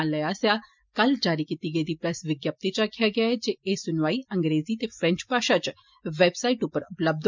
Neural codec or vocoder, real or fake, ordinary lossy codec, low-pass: none; real; MP3, 64 kbps; 7.2 kHz